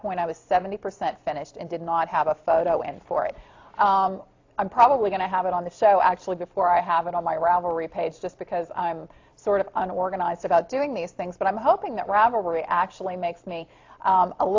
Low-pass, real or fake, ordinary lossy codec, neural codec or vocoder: 7.2 kHz; real; AAC, 48 kbps; none